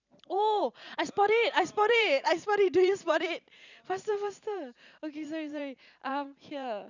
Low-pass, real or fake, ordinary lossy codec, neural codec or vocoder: 7.2 kHz; fake; none; vocoder, 44.1 kHz, 128 mel bands every 512 samples, BigVGAN v2